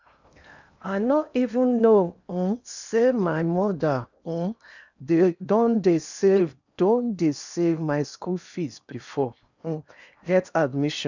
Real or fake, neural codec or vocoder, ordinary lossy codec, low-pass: fake; codec, 16 kHz in and 24 kHz out, 0.8 kbps, FocalCodec, streaming, 65536 codes; none; 7.2 kHz